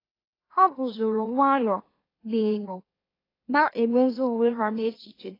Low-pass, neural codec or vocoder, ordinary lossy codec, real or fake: 5.4 kHz; autoencoder, 44.1 kHz, a latent of 192 numbers a frame, MeloTTS; AAC, 24 kbps; fake